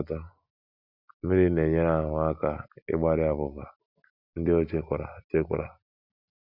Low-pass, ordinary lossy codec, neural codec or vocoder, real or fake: 5.4 kHz; Opus, 64 kbps; none; real